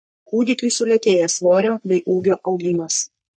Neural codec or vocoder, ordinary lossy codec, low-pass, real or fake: codec, 44.1 kHz, 3.4 kbps, Pupu-Codec; MP3, 48 kbps; 9.9 kHz; fake